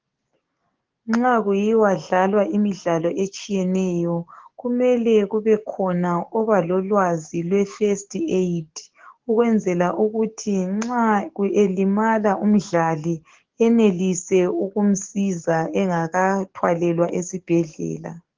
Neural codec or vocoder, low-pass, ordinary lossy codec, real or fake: codec, 44.1 kHz, 7.8 kbps, DAC; 7.2 kHz; Opus, 16 kbps; fake